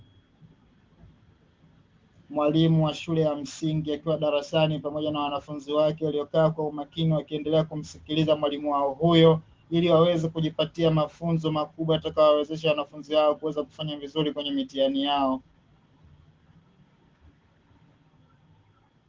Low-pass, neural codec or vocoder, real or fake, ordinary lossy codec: 7.2 kHz; none; real; Opus, 16 kbps